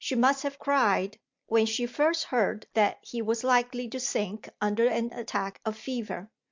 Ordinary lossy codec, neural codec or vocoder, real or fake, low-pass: MP3, 64 kbps; none; real; 7.2 kHz